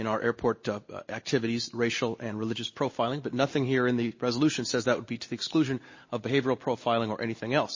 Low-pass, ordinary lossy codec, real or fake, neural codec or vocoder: 7.2 kHz; MP3, 32 kbps; real; none